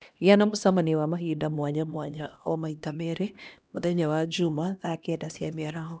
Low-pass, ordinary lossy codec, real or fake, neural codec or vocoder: none; none; fake; codec, 16 kHz, 1 kbps, X-Codec, HuBERT features, trained on LibriSpeech